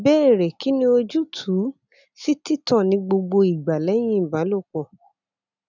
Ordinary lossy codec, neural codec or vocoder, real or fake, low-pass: none; none; real; 7.2 kHz